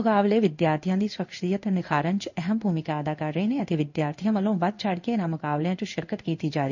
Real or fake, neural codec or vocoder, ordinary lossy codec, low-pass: fake; codec, 16 kHz in and 24 kHz out, 1 kbps, XY-Tokenizer; none; 7.2 kHz